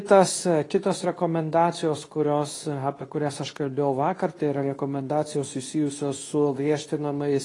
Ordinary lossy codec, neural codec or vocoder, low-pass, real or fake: AAC, 32 kbps; codec, 24 kHz, 0.9 kbps, WavTokenizer, medium speech release version 2; 10.8 kHz; fake